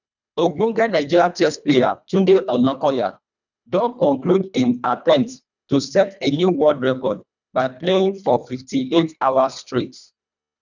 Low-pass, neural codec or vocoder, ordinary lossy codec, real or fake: 7.2 kHz; codec, 24 kHz, 1.5 kbps, HILCodec; none; fake